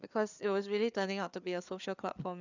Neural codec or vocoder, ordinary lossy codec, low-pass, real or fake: codec, 16 kHz, 4 kbps, FreqCodec, larger model; none; 7.2 kHz; fake